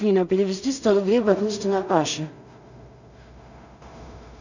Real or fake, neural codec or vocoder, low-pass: fake; codec, 16 kHz in and 24 kHz out, 0.4 kbps, LongCat-Audio-Codec, two codebook decoder; 7.2 kHz